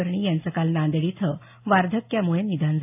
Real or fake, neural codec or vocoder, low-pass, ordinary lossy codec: real; none; 3.6 kHz; AAC, 32 kbps